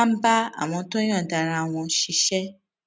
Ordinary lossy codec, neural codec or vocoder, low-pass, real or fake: none; none; none; real